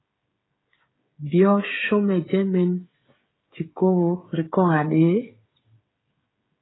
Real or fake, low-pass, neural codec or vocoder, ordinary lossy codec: fake; 7.2 kHz; codec, 16 kHz, 16 kbps, FreqCodec, smaller model; AAC, 16 kbps